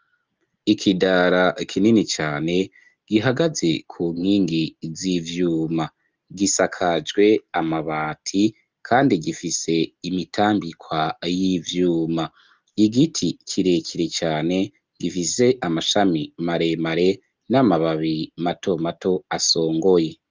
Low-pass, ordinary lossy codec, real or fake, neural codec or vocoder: 7.2 kHz; Opus, 32 kbps; real; none